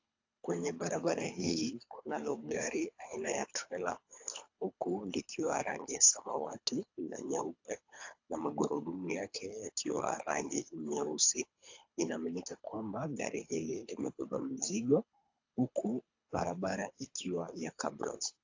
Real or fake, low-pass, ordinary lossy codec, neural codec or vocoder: fake; 7.2 kHz; AAC, 48 kbps; codec, 24 kHz, 3 kbps, HILCodec